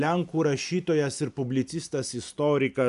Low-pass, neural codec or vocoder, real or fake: 10.8 kHz; none; real